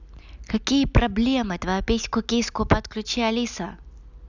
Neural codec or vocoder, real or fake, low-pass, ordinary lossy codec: none; real; 7.2 kHz; none